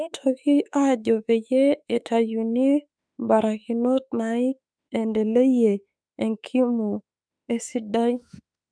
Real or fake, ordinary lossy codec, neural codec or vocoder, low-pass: fake; none; autoencoder, 48 kHz, 32 numbers a frame, DAC-VAE, trained on Japanese speech; 9.9 kHz